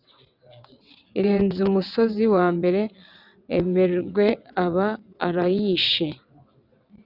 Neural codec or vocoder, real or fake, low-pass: vocoder, 22.05 kHz, 80 mel bands, WaveNeXt; fake; 5.4 kHz